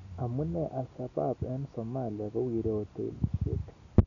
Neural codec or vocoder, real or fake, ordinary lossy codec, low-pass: none; real; none; 7.2 kHz